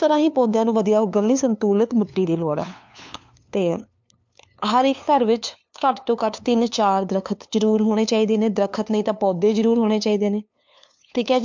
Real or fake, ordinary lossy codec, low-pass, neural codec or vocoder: fake; MP3, 64 kbps; 7.2 kHz; codec, 16 kHz, 2 kbps, FunCodec, trained on LibriTTS, 25 frames a second